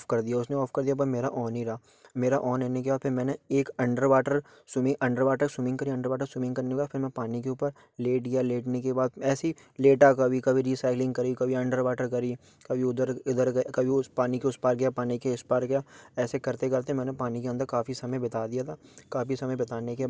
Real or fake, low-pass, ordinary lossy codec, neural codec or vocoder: real; none; none; none